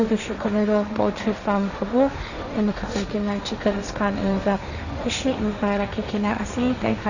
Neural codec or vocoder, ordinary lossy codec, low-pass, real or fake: codec, 16 kHz, 1.1 kbps, Voila-Tokenizer; none; 7.2 kHz; fake